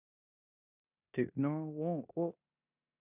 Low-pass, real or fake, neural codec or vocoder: 3.6 kHz; fake; codec, 16 kHz in and 24 kHz out, 0.9 kbps, LongCat-Audio-Codec, four codebook decoder